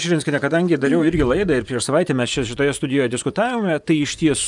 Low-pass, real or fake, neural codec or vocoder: 10.8 kHz; fake; vocoder, 24 kHz, 100 mel bands, Vocos